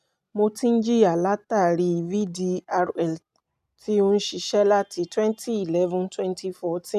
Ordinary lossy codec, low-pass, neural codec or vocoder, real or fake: none; 14.4 kHz; none; real